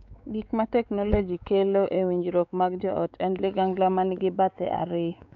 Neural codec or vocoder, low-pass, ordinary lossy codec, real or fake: codec, 16 kHz, 4 kbps, X-Codec, WavLM features, trained on Multilingual LibriSpeech; 7.2 kHz; none; fake